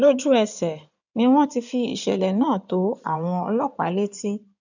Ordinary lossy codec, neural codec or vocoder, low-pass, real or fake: none; codec, 16 kHz in and 24 kHz out, 2.2 kbps, FireRedTTS-2 codec; 7.2 kHz; fake